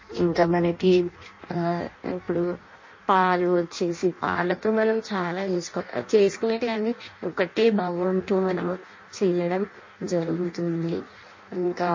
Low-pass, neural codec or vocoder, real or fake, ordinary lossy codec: 7.2 kHz; codec, 16 kHz in and 24 kHz out, 0.6 kbps, FireRedTTS-2 codec; fake; MP3, 32 kbps